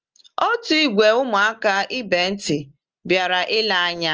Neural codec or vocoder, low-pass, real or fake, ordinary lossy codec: none; 7.2 kHz; real; Opus, 24 kbps